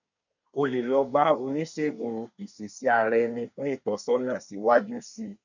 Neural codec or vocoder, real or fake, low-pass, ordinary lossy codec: codec, 24 kHz, 1 kbps, SNAC; fake; 7.2 kHz; none